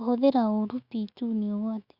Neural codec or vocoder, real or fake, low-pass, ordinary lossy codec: autoencoder, 48 kHz, 128 numbers a frame, DAC-VAE, trained on Japanese speech; fake; 5.4 kHz; Opus, 64 kbps